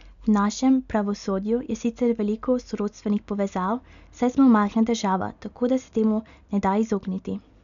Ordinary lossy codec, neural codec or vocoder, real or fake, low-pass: none; none; real; 7.2 kHz